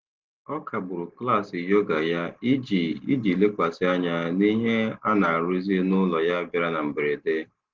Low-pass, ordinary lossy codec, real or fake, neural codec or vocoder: 7.2 kHz; Opus, 16 kbps; real; none